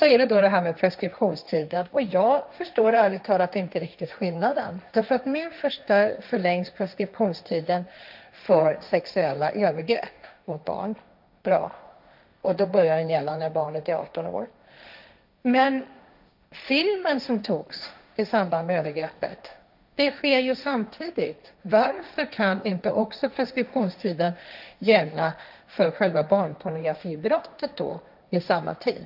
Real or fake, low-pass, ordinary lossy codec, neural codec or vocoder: fake; 5.4 kHz; none; codec, 16 kHz, 1.1 kbps, Voila-Tokenizer